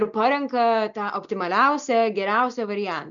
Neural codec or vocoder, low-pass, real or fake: none; 7.2 kHz; real